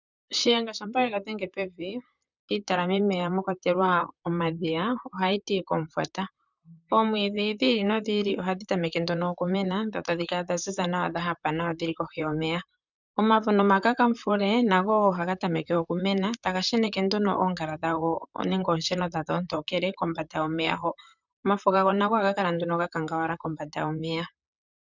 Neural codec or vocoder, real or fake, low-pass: vocoder, 44.1 kHz, 128 mel bands, Pupu-Vocoder; fake; 7.2 kHz